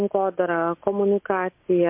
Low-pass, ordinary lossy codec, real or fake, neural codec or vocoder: 3.6 kHz; MP3, 32 kbps; real; none